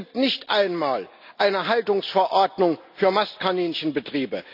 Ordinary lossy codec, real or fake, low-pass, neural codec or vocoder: none; real; 5.4 kHz; none